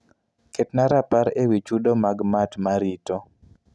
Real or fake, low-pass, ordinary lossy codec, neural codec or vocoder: real; none; none; none